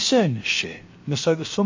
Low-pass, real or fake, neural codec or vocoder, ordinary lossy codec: 7.2 kHz; fake; codec, 16 kHz, about 1 kbps, DyCAST, with the encoder's durations; MP3, 32 kbps